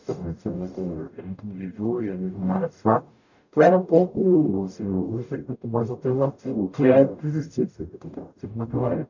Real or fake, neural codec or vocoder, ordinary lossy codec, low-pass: fake; codec, 44.1 kHz, 0.9 kbps, DAC; none; 7.2 kHz